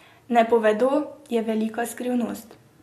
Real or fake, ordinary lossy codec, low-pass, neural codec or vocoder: real; MP3, 64 kbps; 14.4 kHz; none